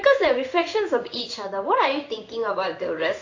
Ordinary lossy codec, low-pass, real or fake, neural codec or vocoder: AAC, 48 kbps; 7.2 kHz; fake; codec, 16 kHz in and 24 kHz out, 1 kbps, XY-Tokenizer